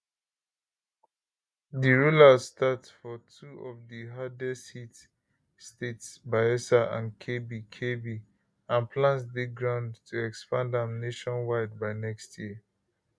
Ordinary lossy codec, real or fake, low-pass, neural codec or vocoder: none; real; none; none